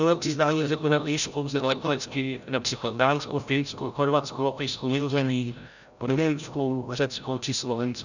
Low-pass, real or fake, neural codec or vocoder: 7.2 kHz; fake; codec, 16 kHz, 0.5 kbps, FreqCodec, larger model